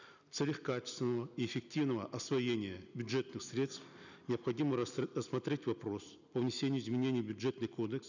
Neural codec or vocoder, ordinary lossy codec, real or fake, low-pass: none; none; real; 7.2 kHz